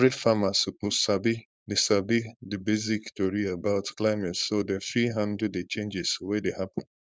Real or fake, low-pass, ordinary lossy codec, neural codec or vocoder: fake; none; none; codec, 16 kHz, 4.8 kbps, FACodec